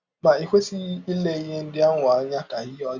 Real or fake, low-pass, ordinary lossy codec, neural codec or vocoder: real; 7.2 kHz; Opus, 64 kbps; none